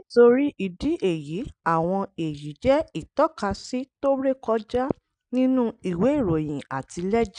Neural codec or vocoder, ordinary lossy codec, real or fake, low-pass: none; none; real; 9.9 kHz